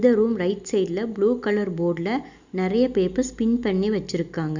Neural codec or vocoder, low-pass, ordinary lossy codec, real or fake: none; 7.2 kHz; Opus, 64 kbps; real